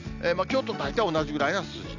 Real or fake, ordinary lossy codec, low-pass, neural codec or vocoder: real; none; 7.2 kHz; none